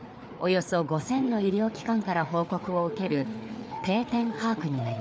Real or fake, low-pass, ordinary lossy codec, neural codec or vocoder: fake; none; none; codec, 16 kHz, 4 kbps, FreqCodec, larger model